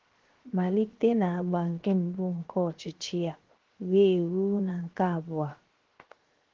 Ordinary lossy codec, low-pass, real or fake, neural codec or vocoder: Opus, 16 kbps; 7.2 kHz; fake; codec, 16 kHz, 0.7 kbps, FocalCodec